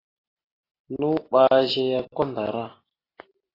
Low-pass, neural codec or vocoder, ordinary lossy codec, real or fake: 5.4 kHz; none; AAC, 24 kbps; real